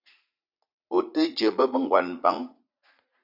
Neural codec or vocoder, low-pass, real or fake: vocoder, 44.1 kHz, 80 mel bands, Vocos; 5.4 kHz; fake